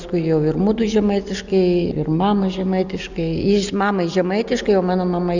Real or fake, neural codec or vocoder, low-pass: real; none; 7.2 kHz